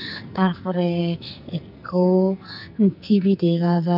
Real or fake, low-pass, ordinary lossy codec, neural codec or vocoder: fake; 5.4 kHz; none; codec, 44.1 kHz, 2.6 kbps, SNAC